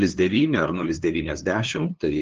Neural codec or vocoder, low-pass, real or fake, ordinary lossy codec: codec, 16 kHz, 2 kbps, FunCodec, trained on LibriTTS, 25 frames a second; 7.2 kHz; fake; Opus, 16 kbps